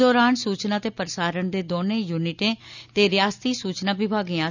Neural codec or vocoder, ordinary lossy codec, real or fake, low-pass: none; none; real; 7.2 kHz